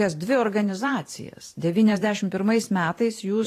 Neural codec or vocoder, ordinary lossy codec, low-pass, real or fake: vocoder, 44.1 kHz, 128 mel bands every 512 samples, BigVGAN v2; AAC, 48 kbps; 14.4 kHz; fake